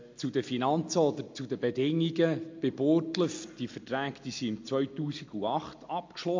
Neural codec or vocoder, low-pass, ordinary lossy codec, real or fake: none; 7.2 kHz; MP3, 48 kbps; real